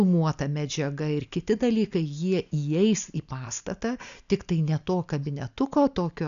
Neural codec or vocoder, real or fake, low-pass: none; real; 7.2 kHz